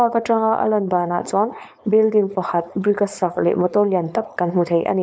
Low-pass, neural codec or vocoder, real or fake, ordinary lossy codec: none; codec, 16 kHz, 4.8 kbps, FACodec; fake; none